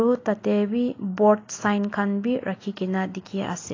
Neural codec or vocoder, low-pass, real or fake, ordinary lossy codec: none; 7.2 kHz; real; AAC, 32 kbps